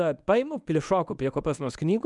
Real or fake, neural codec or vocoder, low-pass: fake; codec, 24 kHz, 0.9 kbps, WavTokenizer, medium speech release version 1; 10.8 kHz